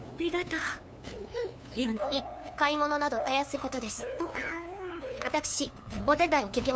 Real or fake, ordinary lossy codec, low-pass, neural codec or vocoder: fake; none; none; codec, 16 kHz, 2 kbps, FunCodec, trained on LibriTTS, 25 frames a second